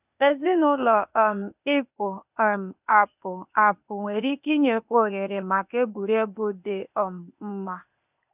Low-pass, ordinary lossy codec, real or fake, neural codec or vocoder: 3.6 kHz; none; fake; codec, 16 kHz, 0.8 kbps, ZipCodec